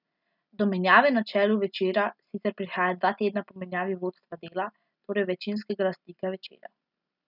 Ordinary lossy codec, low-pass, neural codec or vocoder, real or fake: none; 5.4 kHz; none; real